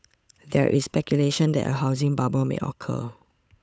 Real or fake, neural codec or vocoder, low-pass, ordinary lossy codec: fake; codec, 16 kHz, 8 kbps, FunCodec, trained on Chinese and English, 25 frames a second; none; none